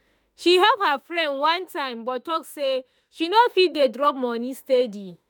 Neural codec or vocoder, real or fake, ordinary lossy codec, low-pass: autoencoder, 48 kHz, 32 numbers a frame, DAC-VAE, trained on Japanese speech; fake; none; none